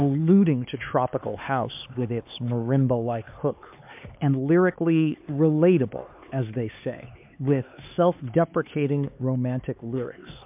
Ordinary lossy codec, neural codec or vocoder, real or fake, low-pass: MP3, 32 kbps; codec, 16 kHz, 4 kbps, X-Codec, HuBERT features, trained on LibriSpeech; fake; 3.6 kHz